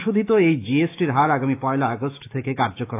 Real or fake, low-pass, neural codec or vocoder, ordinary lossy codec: fake; 3.6 kHz; autoencoder, 48 kHz, 128 numbers a frame, DAC-VAE, trained on Japanese speech; AAC, 24 kbps